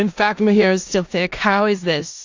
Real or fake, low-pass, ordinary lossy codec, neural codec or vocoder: fake; 7.2 kHz; AAC, 48 kbps; codec, 16 kHz in and 24 kHz out, 0.4 kbps, LongCat-Audio-Codec, four codebook decoder